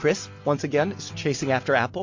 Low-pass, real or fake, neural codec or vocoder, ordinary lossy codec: 7.2 kHz; real; none; MP3, 48 kbps